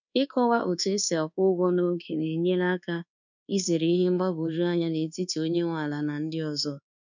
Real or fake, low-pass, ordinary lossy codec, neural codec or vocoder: fake; 7.2 kHz; none; codec, 24 kHz, 1.2 kbps, DualCodec